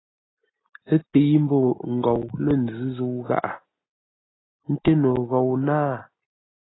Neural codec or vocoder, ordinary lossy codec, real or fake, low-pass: none; AAC, 16 kbps; real; 7.2 kHz